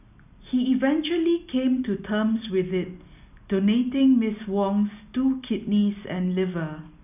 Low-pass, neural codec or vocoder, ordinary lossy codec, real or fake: 3.6 kHz; none; none; real